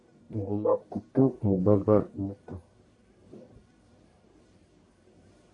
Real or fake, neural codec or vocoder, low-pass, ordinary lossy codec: fake; codec, 44.1 kHz, 1.7 kbps, Pupu-Codec; 10.8 kHz; MP3, 64 kbps